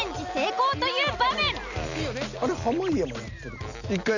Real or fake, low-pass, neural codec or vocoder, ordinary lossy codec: real; 7.2 kHz; none; none